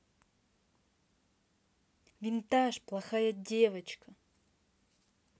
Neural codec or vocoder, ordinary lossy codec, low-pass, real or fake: none; none; none; real